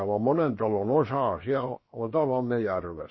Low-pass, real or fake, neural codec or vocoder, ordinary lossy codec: 7.2 kHz; fake; codec, 16 kHz, 0.7 kbps, FocalCodec; MP3, 24 kbps